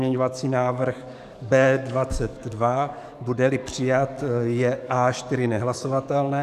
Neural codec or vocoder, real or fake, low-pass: codec, 44.1 kHz, 7.8 kbps, DAC; fake; 14.4 kHz